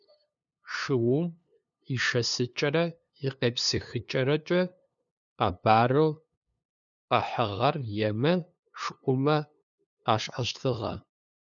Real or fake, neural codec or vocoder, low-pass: fake; codec, 16 kHz, 2 kbps, FunCodec, trained on LibriTTS, 25 frames a second; 7.2 kHz